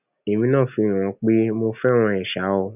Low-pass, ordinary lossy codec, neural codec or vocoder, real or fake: 3.6 kHz; none; none; real